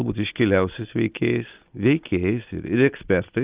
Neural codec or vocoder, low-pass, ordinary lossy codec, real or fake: none; 3.6 kHz; Opus, 32 kbps; real